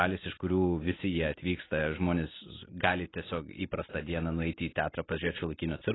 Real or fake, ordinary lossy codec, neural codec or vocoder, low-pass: real; AAC, 16 kbps; none; 7.2 kHz